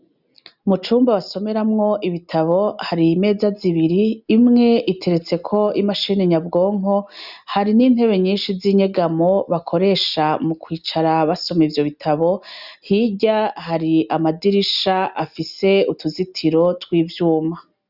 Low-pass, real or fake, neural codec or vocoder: 5.4 kHz; real; none